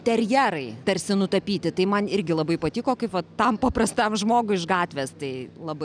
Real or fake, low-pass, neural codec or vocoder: real; 9.9 kHz; none